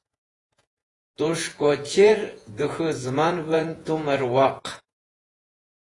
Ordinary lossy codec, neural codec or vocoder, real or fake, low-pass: AAC, 32 kbps; vocoder, 48 kHz, 128 mel bands, Vocos; fake; 10.8 kHz